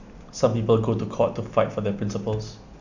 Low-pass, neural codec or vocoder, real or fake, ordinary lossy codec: 7.2 kHz; none; real; none